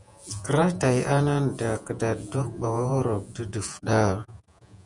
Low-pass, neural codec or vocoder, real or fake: 10.8 kHz; vocoder, 48 kHz, 128 mel bands, Vocos; fake